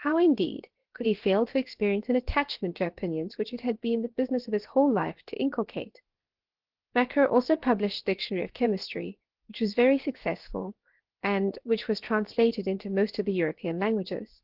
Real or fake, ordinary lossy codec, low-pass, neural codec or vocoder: fake; Opus, 16 kbps; 5.4 kHz; codec, 16 kHz, 0.7 kbps, FocalCodec